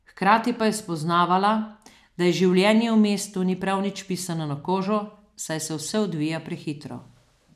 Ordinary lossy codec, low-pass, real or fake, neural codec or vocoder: none; 14.4 kHz; real; none